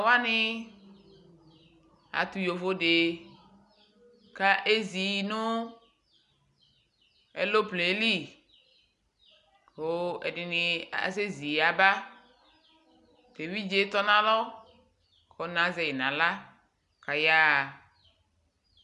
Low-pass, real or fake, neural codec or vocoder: 10.8 kHz; real; none